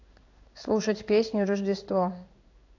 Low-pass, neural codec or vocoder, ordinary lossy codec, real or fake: 7.2 kHz; codec, 16 kHz in and 24 kHz out, 1 kbps, XY-Tokenizer; AAC, 48 kbps; fake